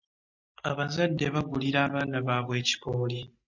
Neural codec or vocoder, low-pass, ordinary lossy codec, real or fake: none; 7.2 kHz; MP3, 64 kbps; real